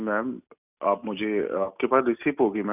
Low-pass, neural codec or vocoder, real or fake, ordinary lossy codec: 3.6 kHz; none; real; none